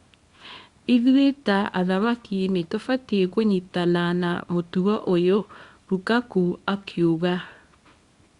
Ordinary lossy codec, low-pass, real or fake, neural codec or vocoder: Opus, 64 kbps; 10.8 kHz; fake; codec, 24 kHz, 0.9 kbps, WavTokenizer, small release